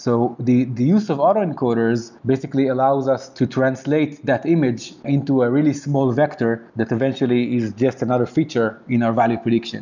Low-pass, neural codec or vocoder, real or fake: 7.2 kHz; none; real